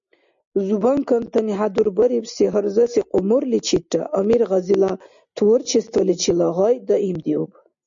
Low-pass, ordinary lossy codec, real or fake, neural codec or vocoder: 7.2 kHz; MP3, 64 kbps; real; none